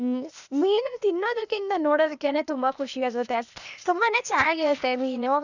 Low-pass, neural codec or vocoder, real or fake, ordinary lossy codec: 7.2 kHz; codec, 16 kHz, 0.8 kbps, ZipCodec; fake; none